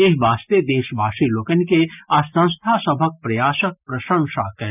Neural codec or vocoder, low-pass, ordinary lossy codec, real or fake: none; 3.6 kHz; none; real